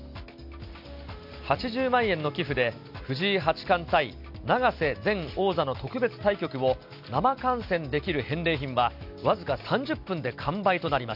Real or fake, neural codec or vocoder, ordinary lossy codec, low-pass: real; none; none; 5.4 kHz